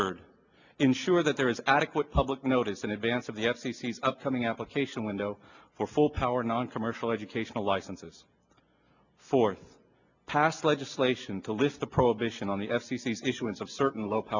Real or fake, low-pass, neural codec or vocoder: real; 7.2 kHz; none